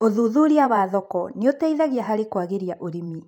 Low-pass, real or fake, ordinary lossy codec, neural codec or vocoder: 19.8 kHz; fake; none; vocoder, 44.1 kHz, 128 mel bands every 512 samples, BigVGAN v2